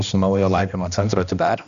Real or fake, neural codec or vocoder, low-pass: fake; codec, 16 kHz, 1 kbps, X-Codec, HuBERT features, trained on balanced general audio; 7.2 kHz